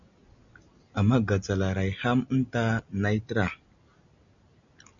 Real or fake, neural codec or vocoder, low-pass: real; none; 7.2 kHz